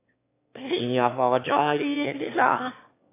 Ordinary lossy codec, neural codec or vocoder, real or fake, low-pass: AAC, 32 kbps; autoencoder, 22.05 kHz, a latent of 192 numbers a frame, VITS, trained on one speaker; fake; 3.6 kHz